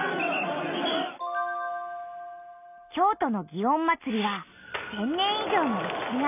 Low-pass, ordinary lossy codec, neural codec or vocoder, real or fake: 3.6 kHz; none; none; real